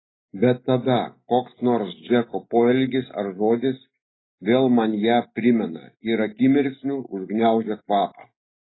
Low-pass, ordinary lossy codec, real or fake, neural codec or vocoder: 7.2 kHz; AAC, 16 kbps; real; none